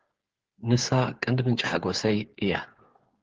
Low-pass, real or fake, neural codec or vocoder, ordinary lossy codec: 7.2 kHz; fake; codec, 16 kHz, 8 kbps, FreqCodec, smaller model; Opus, 16 kbps